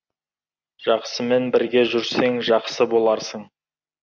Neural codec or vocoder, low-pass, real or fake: none; 7.2 kHz; real